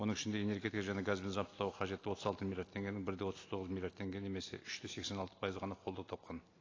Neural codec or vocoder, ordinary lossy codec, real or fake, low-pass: none; AAC, 32 kbps; real; 7.2 kHz